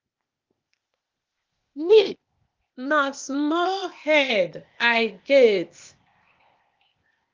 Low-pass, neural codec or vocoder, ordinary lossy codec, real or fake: 7.2 kHz; codec, 16 kHz, 0.8 kbps, ZipCodec; Opus, 32 kbps; fake